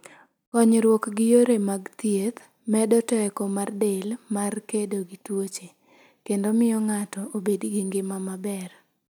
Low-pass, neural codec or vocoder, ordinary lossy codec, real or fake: none; none; none; real